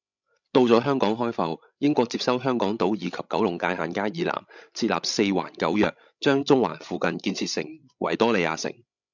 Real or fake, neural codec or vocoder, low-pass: fake; codec, 16 kHz, 16 kbps, FreqCodec, larger model; 7.2 kHz